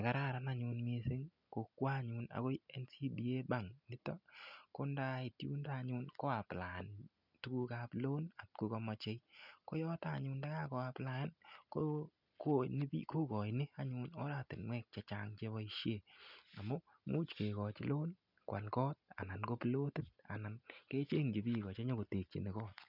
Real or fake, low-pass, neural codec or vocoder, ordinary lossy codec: real; 5.4 kHz; none; none